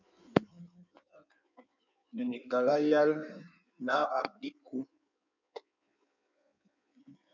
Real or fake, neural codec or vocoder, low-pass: fake; codec, 16 kHz in and 24 kHz out, 1.1 kbps, FireRedTTS-2 codec; 7.2 kHz